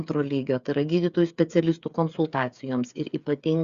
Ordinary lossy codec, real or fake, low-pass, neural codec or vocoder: Opus, 64 kbps; fake; 7.2 kHz; codec, 16 kHz, 8 kbps, FreqCodec, smaller model